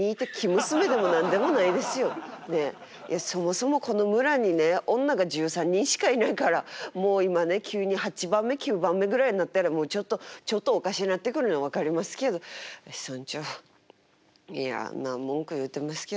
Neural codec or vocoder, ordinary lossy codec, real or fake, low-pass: none; none; real; none